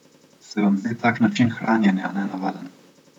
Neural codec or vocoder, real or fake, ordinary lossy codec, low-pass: vocoder, 44.1 kHz, 128 mel bands, Pupu-Vocoder; fake; none; 19.8 kHz